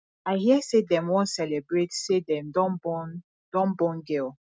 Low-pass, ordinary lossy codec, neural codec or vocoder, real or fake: none; none; none; real